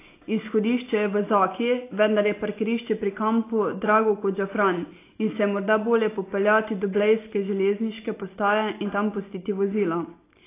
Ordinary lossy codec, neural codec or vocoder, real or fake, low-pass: AAC, 24 kbps; none; real; 3.6 kHz